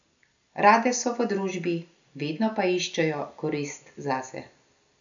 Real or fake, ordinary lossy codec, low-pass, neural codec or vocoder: real; none; 7.2 kHz; none